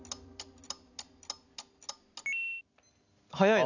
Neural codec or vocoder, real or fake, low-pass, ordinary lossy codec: none; real; 7.2 kHz; none